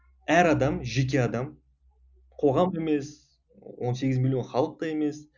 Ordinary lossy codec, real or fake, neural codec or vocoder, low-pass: none; real; none; 7.2 kHz